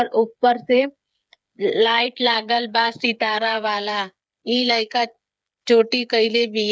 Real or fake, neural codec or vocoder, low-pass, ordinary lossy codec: fake; codec, 16 kHz, 8 kbps, FreqCodec, smaller model; none; none